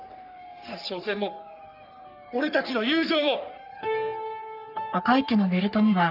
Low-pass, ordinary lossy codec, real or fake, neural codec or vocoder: 5.4 kHz; none; fake; codec, 44.1 kHz, 3.4 kbps, Pupu-Codec